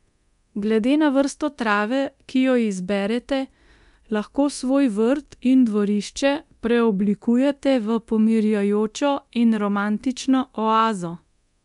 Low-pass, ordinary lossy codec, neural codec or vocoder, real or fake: 10.8 kHz; none; codec, 24 kHz, 0.9 kbps, DualCodec; fake